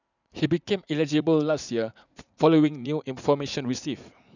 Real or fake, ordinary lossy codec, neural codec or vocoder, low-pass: fake; none; vocoder, 44.1 kHz, 80 mel bands, Vocos; 7.2 kHz